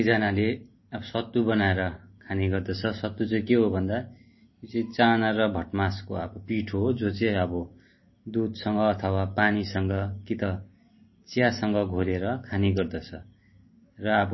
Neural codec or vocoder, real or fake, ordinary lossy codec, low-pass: none; real; MP3, 24 kbps; 7.2 kHz